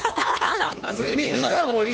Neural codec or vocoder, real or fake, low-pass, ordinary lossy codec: codec, 16 kHz, 2 kbps, X-Codec, HuBERT features, trained on LibriSpeech; fake; none; none